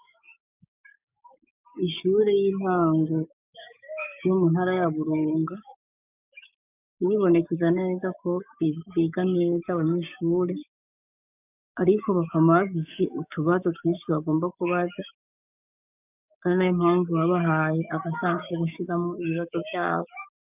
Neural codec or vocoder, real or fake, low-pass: codec, 16 kHz, 6 kbps, DAC; fake; 3.6 kHz